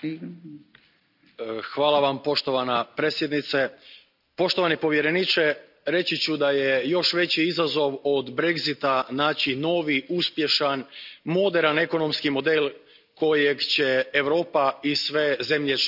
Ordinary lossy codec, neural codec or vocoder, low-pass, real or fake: none; none; 5.4 kHz; real